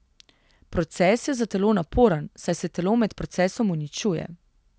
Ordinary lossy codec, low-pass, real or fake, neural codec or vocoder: none; none; real; none